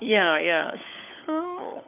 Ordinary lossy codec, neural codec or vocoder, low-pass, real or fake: none; codec, 16 kHz, 16 kbps, FunCodec, trained on LibriTTS, 50 frames a second; 3.6 kHz; fake